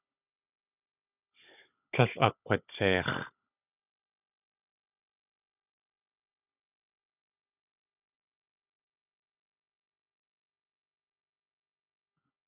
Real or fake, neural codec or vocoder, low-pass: fake; codec, 16 kHz, 16 kbps, FunCodec, trained on Chinese and English, 50 frames a second; 3.6 kHz